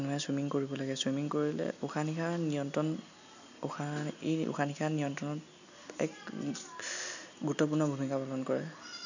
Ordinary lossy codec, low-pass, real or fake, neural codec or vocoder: none; 7.2 kHz; real; none